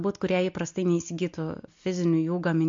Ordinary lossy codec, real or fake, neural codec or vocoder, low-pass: MP3, 48 kbps; real; none; 7.2 kHz